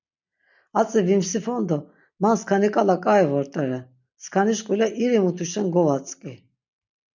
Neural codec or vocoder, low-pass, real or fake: none; 7.2 kHz; real